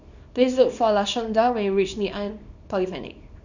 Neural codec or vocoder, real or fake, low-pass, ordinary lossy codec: codec, 24 kHz, 0.9 kbps, WavTokenizer, small release; fake; 7.2 kHz; none